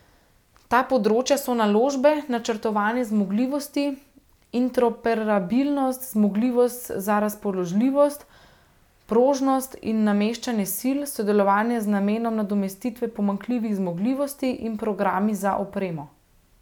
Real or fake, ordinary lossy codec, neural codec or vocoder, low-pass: real; none; none; 19.8 kHz